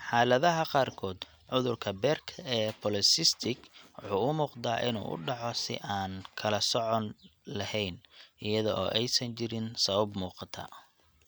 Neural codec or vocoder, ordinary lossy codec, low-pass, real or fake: none; none; none; real